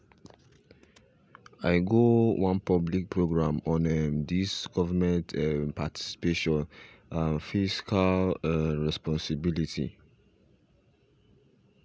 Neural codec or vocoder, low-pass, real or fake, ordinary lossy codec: none; none; real; none